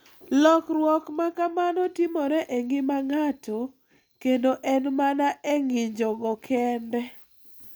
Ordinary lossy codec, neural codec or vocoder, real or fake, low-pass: none; none; real; none